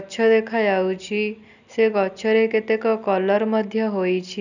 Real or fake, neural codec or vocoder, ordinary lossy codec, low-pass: real; none; none; 7.2 kHz